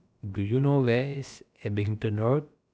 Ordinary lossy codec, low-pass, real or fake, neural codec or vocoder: none; none; fake; codec, 16 kHz, about 1 kbps, DyCAST, with the encoder's durations